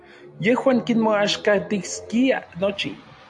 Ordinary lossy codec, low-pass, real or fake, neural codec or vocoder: AAC, 64 kbps; 10.8 kHz; real; none